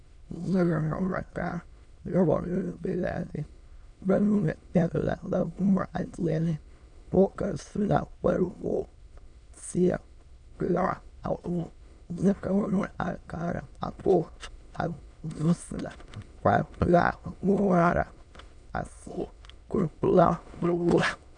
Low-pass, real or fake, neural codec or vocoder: 9.9 kHz; fake; autoencoder, 22.05 kHz, a latent of 192 numbers a frame, VITS, trained on many speakers